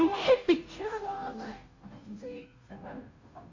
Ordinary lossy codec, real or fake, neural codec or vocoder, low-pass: AAC, 48 kbps; fake; codec, 16 kHz, 0.5 kbps, FunCodec, trained on Chinese and English, 25 frames a second; 7.2 kHz